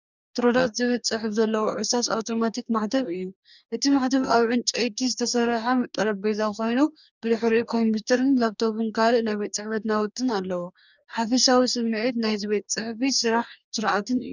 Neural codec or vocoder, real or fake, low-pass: codec, 44.1 kHz, 2.6 kbps, DAC; fake; 7.2 kHz